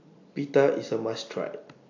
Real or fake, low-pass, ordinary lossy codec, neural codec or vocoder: real; 7.2 kHz; AAC, 48 kbps; none